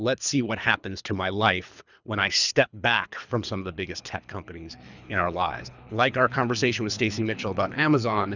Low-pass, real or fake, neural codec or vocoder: 7.2 kHz; fake; codec, 24 kHz, 3 kbps, HILCodec